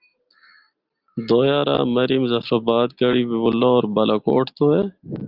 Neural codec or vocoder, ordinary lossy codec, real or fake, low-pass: none; Opus, 32 kbps; real; 5.4 kHz